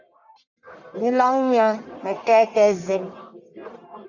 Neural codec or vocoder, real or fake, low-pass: codec, 44.1 kHz, 1.7 kbps, Pupu-Codec; fake; 7.2 kHz